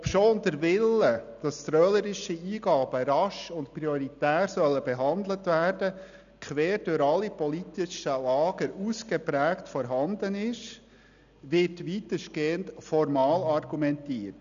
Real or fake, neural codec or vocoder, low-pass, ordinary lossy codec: real; none; 7.2 kHz; none